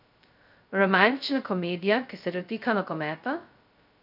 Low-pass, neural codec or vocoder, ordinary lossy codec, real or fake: 5.4 kHz; codec, 16 kHz, 0.2 kbps, FocalCodec; none; fake